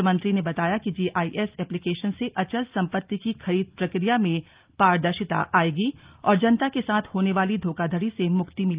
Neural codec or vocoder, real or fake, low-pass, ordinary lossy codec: none; real; 3.6 kHz; Opus, 32 kbps